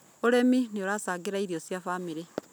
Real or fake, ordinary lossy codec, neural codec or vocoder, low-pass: real; none; none; none